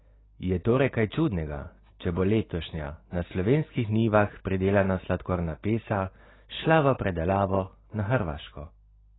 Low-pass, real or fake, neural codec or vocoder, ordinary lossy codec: 7.2 kHz; real; none; AAC, 16 kbps